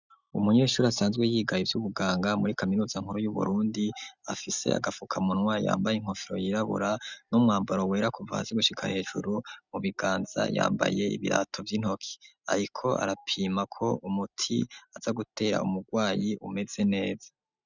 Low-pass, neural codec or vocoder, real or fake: 7.2 kHz; none; real